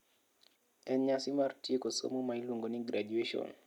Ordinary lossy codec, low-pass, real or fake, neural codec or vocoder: none; 19.8 kHz; real; none